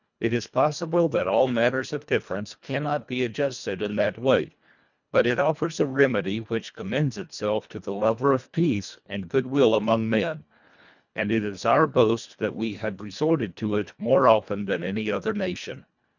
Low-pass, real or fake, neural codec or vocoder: 7.2 kHz; fake; codec, 24 kHz, 1.5 kbps, HILCodec